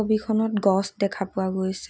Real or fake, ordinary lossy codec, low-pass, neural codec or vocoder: real; none; none; none